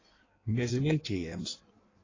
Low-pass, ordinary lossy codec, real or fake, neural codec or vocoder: 7.2 kHz; AAC, 32 kbps; fake; codec, 16 kHz in and 24 kHz out, 1.1 kbps, FireRedTTS-2 codec